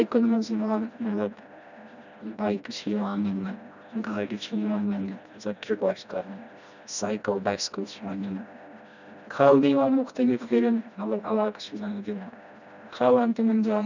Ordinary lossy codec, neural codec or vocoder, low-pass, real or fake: none; codec, 16 kHz, 1 kbps, FreqCodec, smaller model; 7.2 kHz; fake